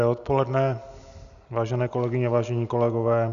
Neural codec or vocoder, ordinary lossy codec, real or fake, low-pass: none; Opus, 64 kbps; real; 7.2 kHz